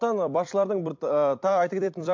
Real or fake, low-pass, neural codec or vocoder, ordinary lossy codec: fake; 7.2 kHz; vocoder, 44.1 kHz, 128 mel bands every 256 samples, BigVGAN v2; MP3, 48 kbps